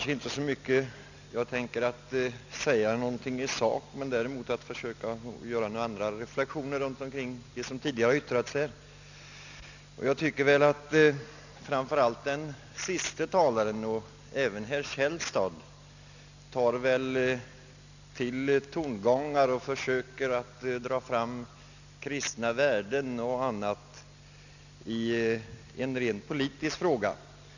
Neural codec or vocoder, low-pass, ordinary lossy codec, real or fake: none; 7.2 kHz; none; real